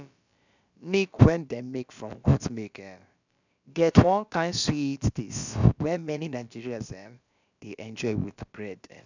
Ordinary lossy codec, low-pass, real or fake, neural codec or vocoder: none; 7.2 kHz; fake; codec, 16 kHz, about 1 kbps, DyCAST, with the encoder's durations